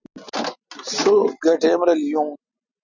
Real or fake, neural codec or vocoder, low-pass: real; none; 7.2 kHz